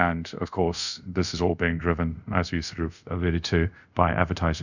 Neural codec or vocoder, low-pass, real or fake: codec, 24 kHz, 0.5 kbps, DualCodec; 7.2 kHz; fake